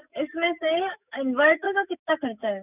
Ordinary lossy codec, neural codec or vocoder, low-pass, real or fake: none; autoencoder, 48 kHz, 128 numbers a frame, DAC-VAE, trained on Japanese speech; 3.6 kHz; fake